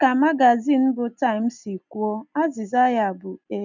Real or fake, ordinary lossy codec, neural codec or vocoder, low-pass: real; none; none; 7.2 kHz